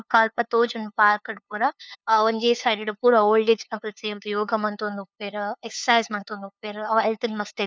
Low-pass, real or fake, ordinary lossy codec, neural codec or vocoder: none; fake; none; codec, 16 kHz, 2 kbps, FunCodec, trained on LibriTTS, 25 frames a second